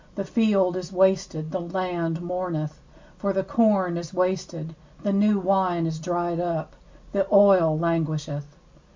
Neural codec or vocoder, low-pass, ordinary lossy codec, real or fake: none; 7.2 kHz; MP3, 64 kbps; real